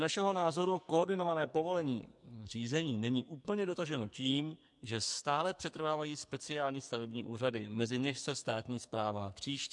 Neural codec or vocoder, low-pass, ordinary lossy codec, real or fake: codec, 44.1 kHz, 2.6 kbps, SNAC; 10.8 kHz; MP3, 64 kbps; fake